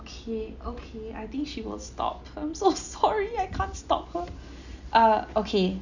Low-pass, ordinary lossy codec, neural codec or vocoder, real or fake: 7.2 kHz; none; none; real